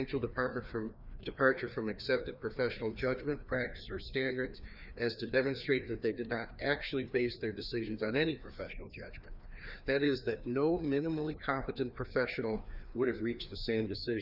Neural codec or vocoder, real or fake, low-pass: codec, 16 kHz, 2 kbps, FreqCodec, larger model; fake; 5.4 kHz